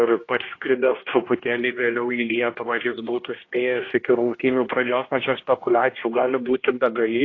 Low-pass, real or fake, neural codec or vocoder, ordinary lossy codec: 7.2 kHz; fake; codec, 16 kHz, 1 kbps, X-Codec, HuBERT features, trained on general audio; AAC, 32 kbps